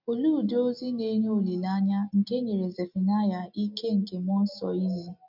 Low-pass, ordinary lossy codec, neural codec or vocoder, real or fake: 5.4 kHz; none; none; real